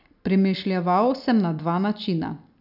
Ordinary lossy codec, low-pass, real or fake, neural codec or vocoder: none; 5.4 kHz; real; none